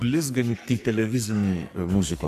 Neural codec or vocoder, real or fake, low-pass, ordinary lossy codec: codec, 44.1 kHz, 2.6 kbps, SNAC; fake; 14.4 kHz; AAC, 96 kbps